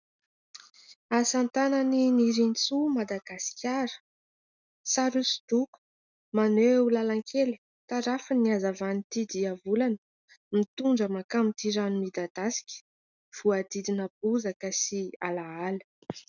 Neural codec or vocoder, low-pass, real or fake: none; 7.2 kHz; real